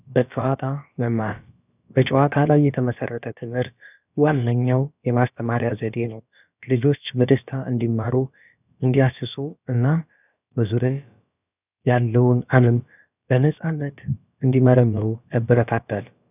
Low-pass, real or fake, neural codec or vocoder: 3.6 kHz; fake; codec, 16 kHz, about 1 kbps, DyCAST, with the encoder's durations